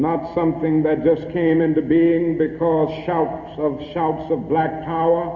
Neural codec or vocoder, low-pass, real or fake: none; 7.2 kHz; real